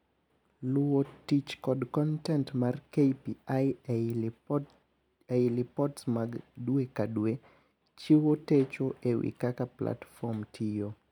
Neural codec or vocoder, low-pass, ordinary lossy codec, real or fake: none; 19.8 kHz; none; real